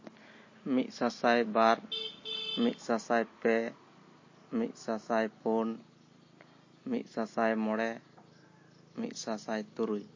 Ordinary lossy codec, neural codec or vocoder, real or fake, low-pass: MP3, 32 kbps; none; real; 7.2 kHz